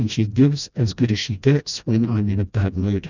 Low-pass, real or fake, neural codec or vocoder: 7.2 kHz; fake; codec, 16 kHz, 1 kbps, FreqCodec, smaller model